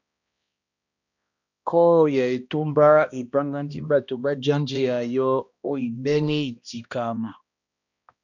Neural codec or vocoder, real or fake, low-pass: codec, 16 kHz, 1 kbps, X-Codec, HuBERT features, trained on balanced general audio; fake; 7.2 kHz